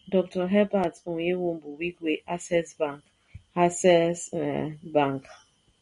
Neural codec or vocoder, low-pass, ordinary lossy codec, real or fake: none; 10.8 kHz; MP3, 48 kbps; real